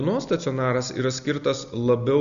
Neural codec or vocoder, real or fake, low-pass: none; real; 7.2 kHz